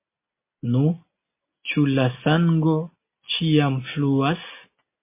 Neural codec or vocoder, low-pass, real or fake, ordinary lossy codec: none; 3.6 kHz; real; MP3, 24 kbps